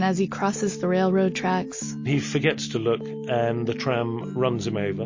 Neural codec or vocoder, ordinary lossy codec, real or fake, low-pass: none; MP3, 32 kbps; real; 7.2 kHz